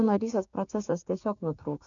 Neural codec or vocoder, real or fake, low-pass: codec, 16 kHz, 6 kbps, DAC; fake; 7.2 kHz